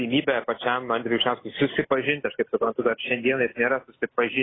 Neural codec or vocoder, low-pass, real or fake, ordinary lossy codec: none; 7.2 kHz; real; AAC, 16 kbps